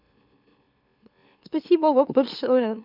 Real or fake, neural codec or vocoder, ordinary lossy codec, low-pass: fake; autoencoder, 44.1 kHz, a latent of 192 numbers a frame, MeloTTS; none; 5.4 kHz